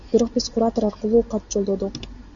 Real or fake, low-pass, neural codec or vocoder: real; 7.2 kHz; none